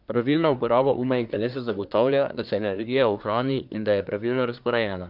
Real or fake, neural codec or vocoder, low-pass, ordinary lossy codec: fake; codec, 24 kHz, 1 kbps, SNAC; 5.4 kHz; none